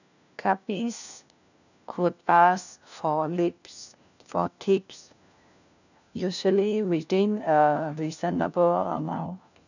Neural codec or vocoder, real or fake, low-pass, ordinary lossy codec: codec, 16 kHz, 1 kbps, FunCodec, trained on LibriTTS, 50 frames a second; fake; 7.2 kHz; none